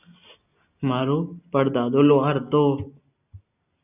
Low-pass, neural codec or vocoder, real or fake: 3.6 kHz; none; real